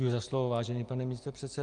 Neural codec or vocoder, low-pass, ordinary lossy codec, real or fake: none; 9.9 kHz; Opus, 32 kbps; real